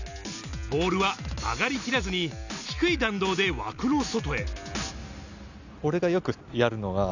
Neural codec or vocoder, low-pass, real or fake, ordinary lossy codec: none; 7.2 kHz; real; none